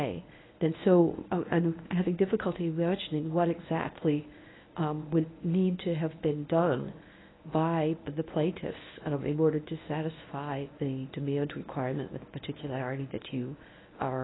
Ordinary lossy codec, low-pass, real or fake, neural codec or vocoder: AAC, 16 kbps; 7.2 kHz; fake; codec, 24 kHz, 0.9 kbps, WavTokenizer, medium speech release version 1